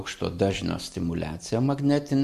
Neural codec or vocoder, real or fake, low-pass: none; real; 14.4 kHz